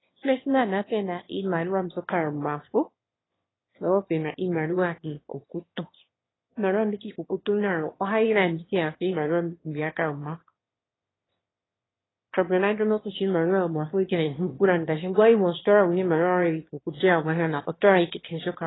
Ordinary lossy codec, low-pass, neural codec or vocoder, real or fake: AAC, 16 kbps; 7.2 kHz; autoencoder, 22.05 kHz, a latent of 192 numbers a frame, VITS, trained on one speaker; fake